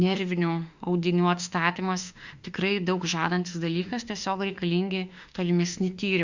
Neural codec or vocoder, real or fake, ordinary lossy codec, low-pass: autoencoder, 48 kHz, 32 numbers a frame, DAC-VAE, trained on Japanese speech; fake; Opus, 64 kbps; 7.2 kHz